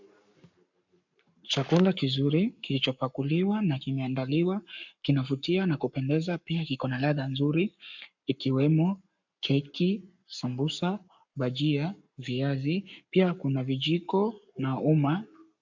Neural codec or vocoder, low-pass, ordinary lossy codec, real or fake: codec, 44.1 kHz, 7.8 kbps, Pupu-Codec; 7.2 kHz; MP3, 64 kbps; fake